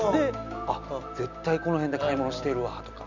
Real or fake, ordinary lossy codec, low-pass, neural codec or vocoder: real; none; 7.2 kHz; none